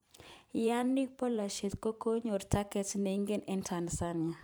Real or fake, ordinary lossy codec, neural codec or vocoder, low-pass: fake; none; vocoder, 44.1 kHz, 128 mel bands every 512 samples, BigVGAN v2; none